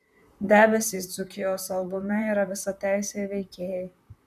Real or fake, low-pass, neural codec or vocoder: fake; 14.4 kHz; vocoder, 44.1 kHz, 128 mel bands, Pupu-Vocoder